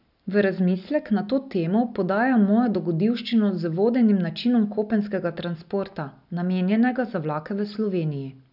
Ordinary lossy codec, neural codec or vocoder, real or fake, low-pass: none; none; real; 5.4 kHz